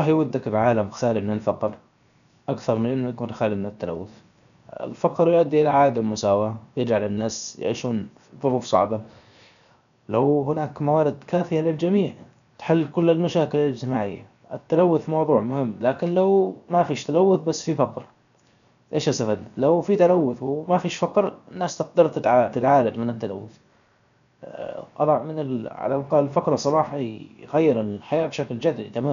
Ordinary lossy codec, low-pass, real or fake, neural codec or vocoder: none; 7.2 kHz; fake; codec, 16 kHz, 0.7 kbps, FocalCodec